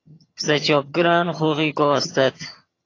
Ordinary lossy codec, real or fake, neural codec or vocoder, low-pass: AAC, 32 kbps; fake; vocoder, 22.05 kHz, 80 mel bands, HiFi-GAN; 7.2 kHz